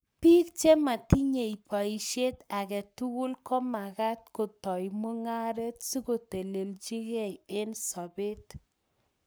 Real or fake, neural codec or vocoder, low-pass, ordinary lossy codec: fake; codec, 44.1 kHz, 7.8 kbps, Pupu-Codec; none; none